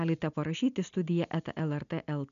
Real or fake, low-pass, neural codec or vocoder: real; 7.2 kHz; none